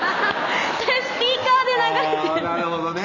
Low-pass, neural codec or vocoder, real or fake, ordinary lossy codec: 7.2 kHz; none; real; none